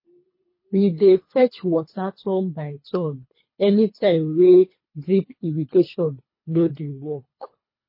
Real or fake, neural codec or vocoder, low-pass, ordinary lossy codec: fake; codec, 24 kHz, 3 kbps, HILCodec; 5.4 kHz; MP3, 24 kbps